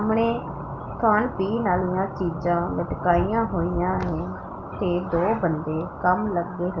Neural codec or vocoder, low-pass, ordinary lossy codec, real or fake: none; 7.2 kHz; Opus, 24 kbps; real